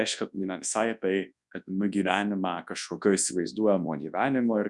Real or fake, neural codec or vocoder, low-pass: fake; codec, 24 kHz, 0.9 kbps, WavTokenizer, large speech release; 10.8 kHz